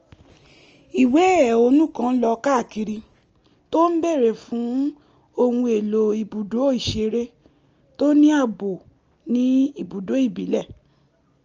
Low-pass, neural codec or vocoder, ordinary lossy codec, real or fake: 7.2 kHz; none; Opus, 24 kbps; real